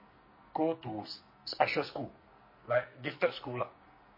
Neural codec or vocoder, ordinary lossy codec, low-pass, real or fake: codec, 44.1 kHz, 2.6 kbps, SNAC; MP3, 24 kbps; 5.4 kHz; fake